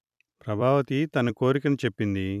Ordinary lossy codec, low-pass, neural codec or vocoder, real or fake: none; 14.4 kHz; vocoder, 44.1 kHz, 128 mel bands every 256 samples, BigVGAN v2; fake